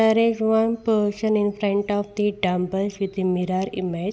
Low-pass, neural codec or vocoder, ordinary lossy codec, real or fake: none; none; none; real